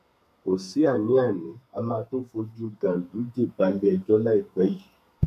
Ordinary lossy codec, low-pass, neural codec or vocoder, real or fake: none; 14.4 kHz; codec, 32 kHz, 1.9 kbps, SNAC; fake